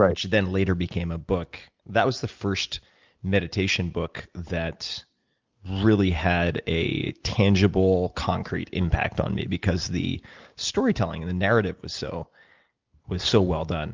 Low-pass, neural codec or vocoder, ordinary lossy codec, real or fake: 7.2 kHz; none; Opus, 24 kbps; real